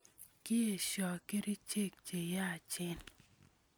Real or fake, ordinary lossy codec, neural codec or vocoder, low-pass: real; none; none; none